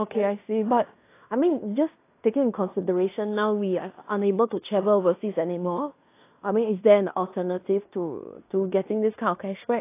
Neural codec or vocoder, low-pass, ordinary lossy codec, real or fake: codec, 16 kHz in and 24 kHz out, 0.9 kbps, LongCat-Audio-Codec, fine tuned four codebook decoder; 3.6 kHz; AAC, 24 kbps; fake